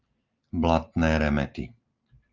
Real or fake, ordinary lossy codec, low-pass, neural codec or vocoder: real; Opus, 32 kbps; 7.2 kHz; none